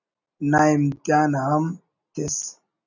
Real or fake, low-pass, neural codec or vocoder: real; 7.2 kHz; none